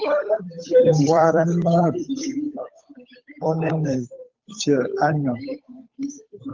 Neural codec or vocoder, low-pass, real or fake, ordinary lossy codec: codec, 16 kHz, 8 kbps, FunCodec, trained on Chinese and English, 25 frames a second; 7.2 kHz; fake; Opus, 24 kbps